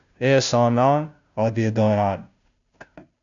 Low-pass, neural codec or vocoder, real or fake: 7.2 kHz; codec, 16 kHz, 0.5 kbps, FunCodec, trained on Chinese and English, 25 frames a second; fake